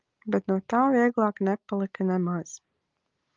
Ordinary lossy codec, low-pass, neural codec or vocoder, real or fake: Opus, 32 kbps; 7.2 kHz; none; real